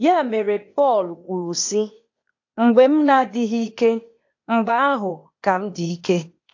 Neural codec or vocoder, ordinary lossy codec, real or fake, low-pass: codec, 16 kHz, 0.8 kbps, ZipCodec; AAC, 48 kbps; fake; 7.2 kHz